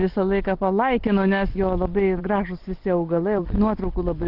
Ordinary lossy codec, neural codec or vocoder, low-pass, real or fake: Opus, 24 kbps; none; 5.4 kHz; real